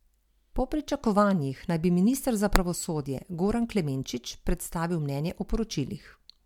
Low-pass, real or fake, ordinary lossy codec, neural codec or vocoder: 19.8 kHz; real; MP3, 96 kbps; none